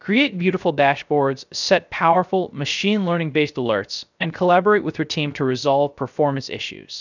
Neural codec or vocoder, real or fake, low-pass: codec, 16 kHz, 0.3 kbps, FocalCodec; fake; 7.2 kHz